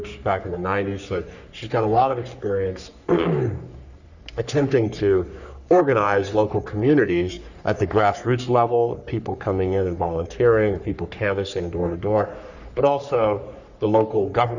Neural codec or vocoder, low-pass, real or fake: codec, 44.1 kHz, 3.4 kbps, Pupu-Codec; 7.2 kHz; fake